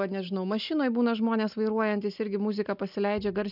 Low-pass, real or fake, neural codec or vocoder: 5.4 kHz; real; none